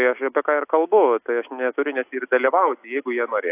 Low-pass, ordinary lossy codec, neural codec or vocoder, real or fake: 3.6 kHz; AAC, 24 kbps; none; real